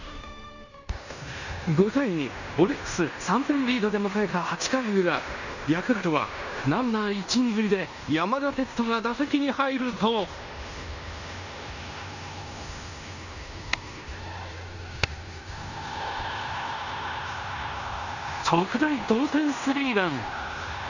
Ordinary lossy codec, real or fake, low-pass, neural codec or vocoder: none; fake; 7.2 kHz; codec, 16 kHz in and 24 kHz out, 0.9 kbps, LongCat-Audio-Codec, fine tuned four codebook decoder